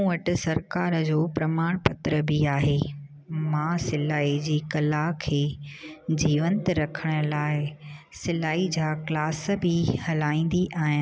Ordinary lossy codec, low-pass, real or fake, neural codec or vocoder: none; none; real; none